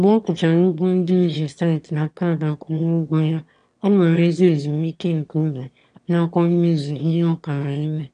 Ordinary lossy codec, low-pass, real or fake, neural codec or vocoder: none; 9.9 kHz; fake; autoencoder, 22.05 kHz, a latent of 192 numbers a frame, VITS, trained on one speaker